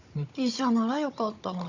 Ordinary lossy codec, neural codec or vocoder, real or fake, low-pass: Opus, 64 kbps; codec, 16 kHz, 16 kbps, FunCodec, trained on Chinese and English, 50 frames a second; fake; 7.2 kHz